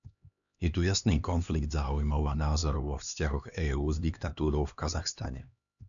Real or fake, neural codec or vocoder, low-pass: fake; codec, 16 kHz, 2 kbps, X-Codec, HuBERT features, trained on LibriSpeech; 7.2 kHz